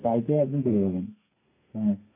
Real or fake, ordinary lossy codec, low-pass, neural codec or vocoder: fake; AAC, 32 kbps; 3.6 kHz; codec, 32 kHz, 1.9 kbps, SNAC